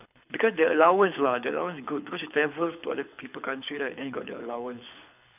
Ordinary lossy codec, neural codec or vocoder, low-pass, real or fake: none; codec, 24 kHz, 6 kbps, HILCodec; 3.6 kHz; fake